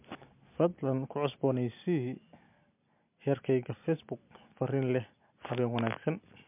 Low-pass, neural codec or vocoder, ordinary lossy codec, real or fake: 3.6 kHz; none; MP3, 32 kbps; real